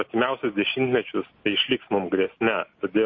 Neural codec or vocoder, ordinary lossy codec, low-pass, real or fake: none; MP3, 32 kbps; 7.2 kHz; real